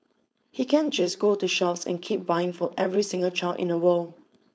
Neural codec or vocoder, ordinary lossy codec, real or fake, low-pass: codec, 16 kHz, 4.8 kbps, FACodec; none; fake; none